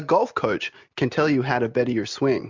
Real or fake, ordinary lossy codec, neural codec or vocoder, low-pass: real; MP3, 64 kbps; none; 7.2 kHz